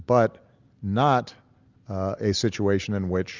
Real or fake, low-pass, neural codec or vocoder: real; 7.2 kHz; none